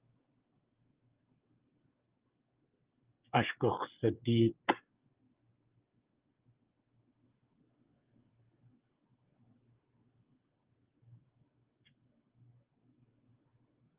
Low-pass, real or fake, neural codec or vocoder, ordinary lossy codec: 3.6 kHz; fake; codec, 16 kHz, 16 kbps, FreqCodec, smaller model; Opus, 24 kbps